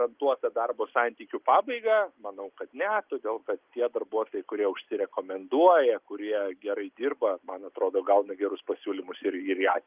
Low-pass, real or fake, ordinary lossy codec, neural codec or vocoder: 3.6 kHz; real; Opus, 24 kbps; none